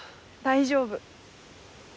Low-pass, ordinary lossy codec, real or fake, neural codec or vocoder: none; none; real; none